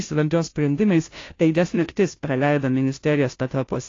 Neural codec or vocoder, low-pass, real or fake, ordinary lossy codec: codec, 16 kHz, 0.5 kbps, FunCodec, trained on Chinese and English, 25 frames a second; 7.2 kHz; fake; AAC, 32 kbps